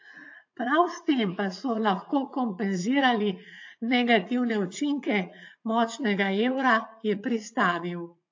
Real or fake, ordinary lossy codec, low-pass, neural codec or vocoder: fake; AAC, 48 kbps; 7.2 kHz; vocoder, 44.1 kHz, 80 mel bands, Vocos